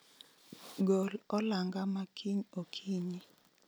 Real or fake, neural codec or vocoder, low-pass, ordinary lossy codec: real; none; none; none